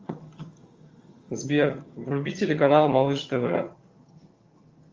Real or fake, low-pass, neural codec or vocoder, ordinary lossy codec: fake; 7.2 kHz; vocoder, 22.05 kHz, 80 mel bands, HiFi-GAN; Opus, 32 kbps